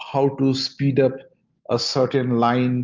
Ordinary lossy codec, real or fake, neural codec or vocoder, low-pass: Opus, 24 kbps; real; none; 7.2 kHz